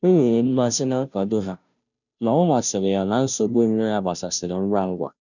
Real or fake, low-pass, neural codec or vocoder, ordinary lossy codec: fake; 7.2 kHz; codec, 16 kHz, 0.5 kbps, FunCodec, trained on Chinese and English, 25 frames a second; none